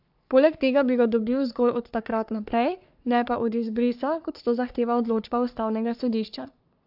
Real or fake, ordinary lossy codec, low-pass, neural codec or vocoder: fake; AAC, 48 kbps; 5.4 kHz; codec, 44.1 kHz, 3.4 kbps, Pupu-Codec